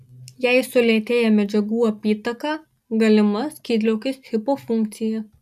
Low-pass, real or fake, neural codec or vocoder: 14.4 kHz; real; none